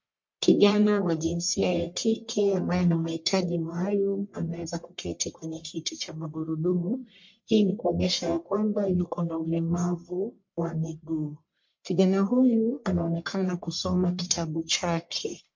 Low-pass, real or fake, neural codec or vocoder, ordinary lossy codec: 7.2 kHz; fake; codec, 44.1 kHz, 1.7 kbps, Pupu-Codec; MP3, 48 kbps